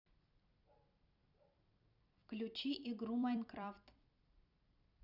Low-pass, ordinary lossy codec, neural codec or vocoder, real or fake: 5.4 kHz; none; vocoder, 44.1 kHz, 128 mel bands every 256 samples, BigVGAN v2; fake